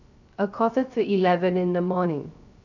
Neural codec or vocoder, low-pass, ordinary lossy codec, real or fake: codec, 16 kHz, 0.3 kbps, FocalCodec; 7.2 kHz; none; fake